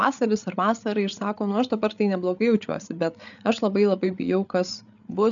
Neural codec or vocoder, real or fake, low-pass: codec, 16 kHz, 8 kbps, FreqCodec, larger model; fake; 7.2 kHz